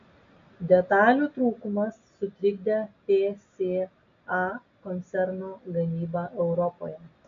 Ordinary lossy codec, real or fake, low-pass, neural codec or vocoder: MP3, 64 kbps; real; 7.2 kHz; none